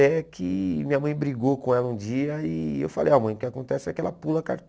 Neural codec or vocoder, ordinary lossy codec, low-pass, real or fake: none; none; none; real